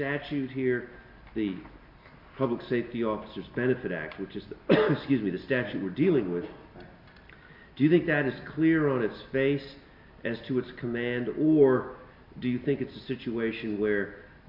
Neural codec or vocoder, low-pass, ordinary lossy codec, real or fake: none; 5.4 kHz; MP3, 48 kbps; real